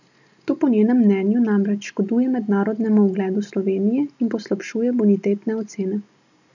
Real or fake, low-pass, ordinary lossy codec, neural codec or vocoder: real; 7.2 kHz; none; none